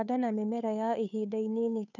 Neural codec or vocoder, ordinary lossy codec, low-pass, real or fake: codec, 16 kHz, 2 kbps, FunCodec, trained on Chinese and English, 25 frames a second; none; 7.2 kHz; fake